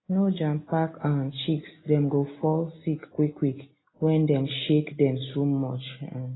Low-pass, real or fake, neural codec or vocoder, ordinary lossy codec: 7.2 kHz; real; none; AAC, 16 kbps